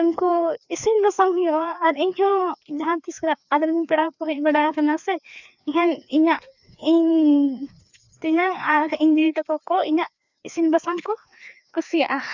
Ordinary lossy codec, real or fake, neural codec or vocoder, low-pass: none; fake; codec, 16 kHz, 2 kbps, FreqCodec, larger model; 7.2 kHz